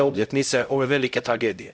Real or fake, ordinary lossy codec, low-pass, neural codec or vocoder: fake; none; none; codec, 16 kHz, 0.5 kbps, X-Codec, HuBERT features, trained on LibriSpeech